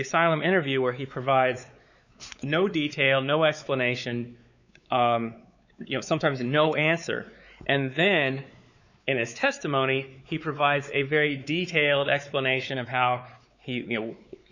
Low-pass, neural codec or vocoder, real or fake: 7.2 kHz; codec, 16 kHz, 4 kbps, X-Codec, WavLM features, trained on Multilingual LibriSpeech; fake